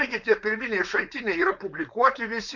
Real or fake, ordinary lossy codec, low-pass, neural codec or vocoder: fake; MP3, 64 kbps; 7.2 kHz; codec, 16 kHz, 4.8 kbps, FACodec